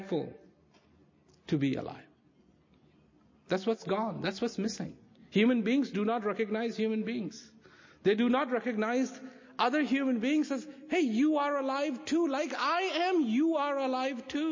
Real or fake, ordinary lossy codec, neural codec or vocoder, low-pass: real; MP3, 32 kbps; none; 7.2 kHz